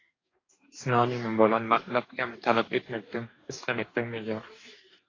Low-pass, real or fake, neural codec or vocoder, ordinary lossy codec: 7.2 kHz; fake; codec, 44.1 kHz, 2.6 kbps, DAC; AAC, 32 kbps